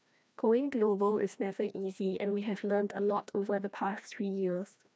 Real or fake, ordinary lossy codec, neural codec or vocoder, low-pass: fake; none; codec, 16 kHz, 1 kbps, FreqCodec, larger model; none